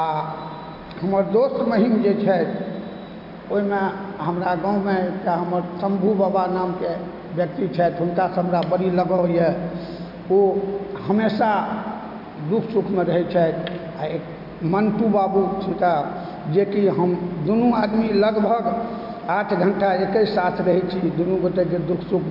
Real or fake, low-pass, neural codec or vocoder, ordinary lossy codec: real; 5.4 kHz; none; MP3, 48 kbps